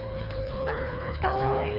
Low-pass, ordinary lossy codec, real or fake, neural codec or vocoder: 5.4 kHz; none; fake; codec, 24 kHz, 3 kbps, HILCodec